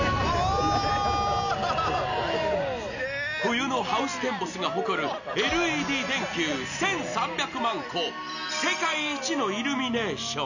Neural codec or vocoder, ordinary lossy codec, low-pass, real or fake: none; none; 7.2 kHz; real